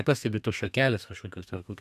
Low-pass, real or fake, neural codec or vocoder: 14.4 kHz; fake; codec, 32 kHz, 1.9 kbps, SNAC